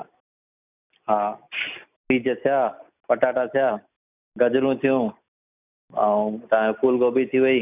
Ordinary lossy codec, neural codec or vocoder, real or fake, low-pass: none; none; real; 3.6 kHz